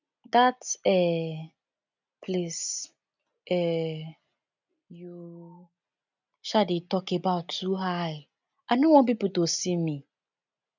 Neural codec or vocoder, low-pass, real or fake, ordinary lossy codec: none; 7.2 kHz; real; none